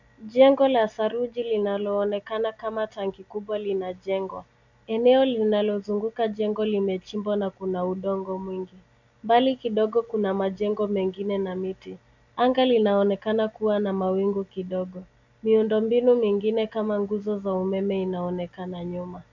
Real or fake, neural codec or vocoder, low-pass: real; none; 7.2 kHz